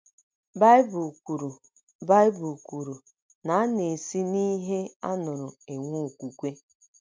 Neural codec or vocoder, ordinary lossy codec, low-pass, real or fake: none; none; none; real